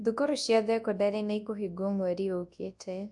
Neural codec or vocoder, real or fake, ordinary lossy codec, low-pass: codec, 24 kHz, 0.9 kbps, WavTokenizer, large speech release; fake; AAC, 64 kbps; 10.8 kHz